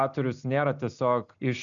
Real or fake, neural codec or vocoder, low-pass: real; none; 7.2 kHz